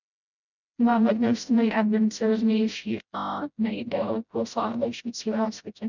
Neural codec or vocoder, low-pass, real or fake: codec, 16 kHz, 0.5 kbps, FreqCodec, smaller model; 7.2 kHz; fake